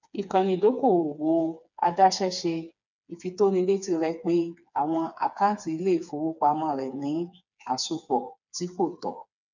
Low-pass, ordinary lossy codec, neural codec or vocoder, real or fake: 7.2 kHz; none; codec, 16 kHz, 4 kbps, FreqCodec, smaller model; fake